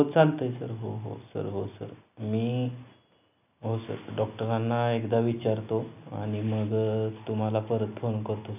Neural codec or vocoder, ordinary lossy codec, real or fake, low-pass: none; none; real; 3.6 kHz